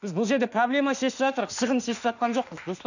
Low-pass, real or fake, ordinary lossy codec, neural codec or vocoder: 7.2 kHz; fake; none; autoencoder, 48 kHz, 32 numbers a frame, DAC-VAE, trained on Japanese speech